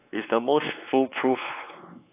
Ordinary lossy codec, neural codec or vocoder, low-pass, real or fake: AAC, 32 kbps; codec, 16 kHz, 4 kbps, X-Codec, WavLM features, trained on Multilingual LibriSpeech; 3.6 kHz; fake